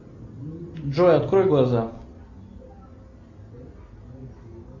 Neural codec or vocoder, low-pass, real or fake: none; 7.2 kHz; real